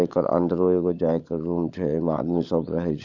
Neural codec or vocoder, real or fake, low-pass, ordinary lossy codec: codec, 16 kHz, 16 kbps, FunCodec, trained on LibriTTS, 50 frames a second; fake; 7.2 kHz; none